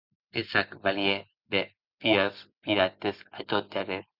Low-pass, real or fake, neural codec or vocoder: 5.4 kHz; real; none